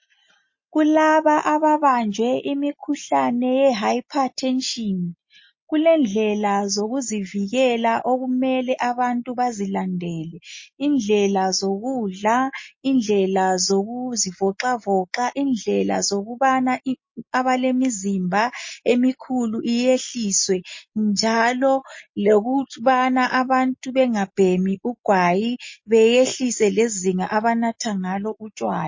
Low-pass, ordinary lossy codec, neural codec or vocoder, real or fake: 7.2 kHz; MP3, 32 kbps; none; real